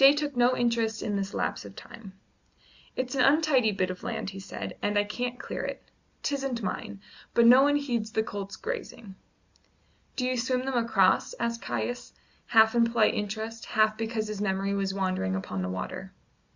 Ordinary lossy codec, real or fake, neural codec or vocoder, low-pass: Opus, 64 kbps; real; none; 7.2 kHz